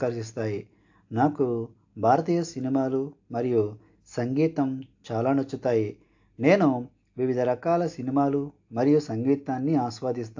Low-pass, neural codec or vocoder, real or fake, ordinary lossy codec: 7.2 kHz; none; real; AAC, 48 kbps